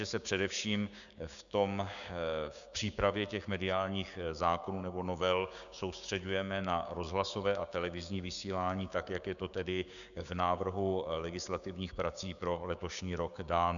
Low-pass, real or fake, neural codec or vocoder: 7.2 kHz; fake; codec, 16 kHz, 6 kbps, DAC